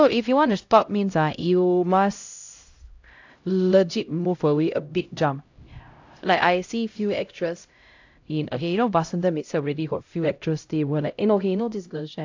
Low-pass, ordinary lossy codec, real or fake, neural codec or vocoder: 7.2 kHz; AAC, 48 kbps; fake; codec, 16 kHz, 0.5 kbps, X-Codec, HuBERT features, trained on LibriSpeech